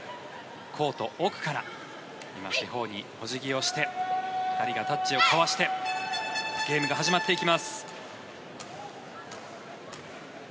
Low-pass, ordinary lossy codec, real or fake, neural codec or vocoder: none; none; real; none